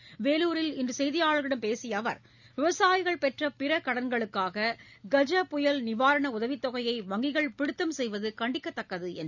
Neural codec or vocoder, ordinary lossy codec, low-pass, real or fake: none; none; 7.2 kHz; real